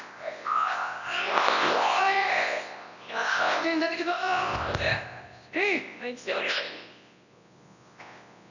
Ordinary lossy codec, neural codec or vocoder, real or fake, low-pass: none; codec, 24 kHz, 0.9 kbps, WavTokenizer, large speech release; fake; 7.2 kHz